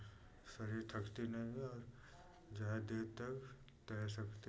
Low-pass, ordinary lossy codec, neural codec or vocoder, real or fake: none; none; none; real